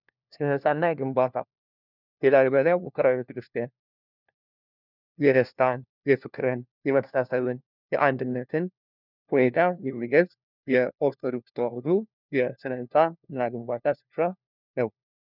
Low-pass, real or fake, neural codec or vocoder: 5.4 kHz; fake; codec, 16 kHz, 1 kbps, FunCodec, trained on LibriTTS, 50 frames a second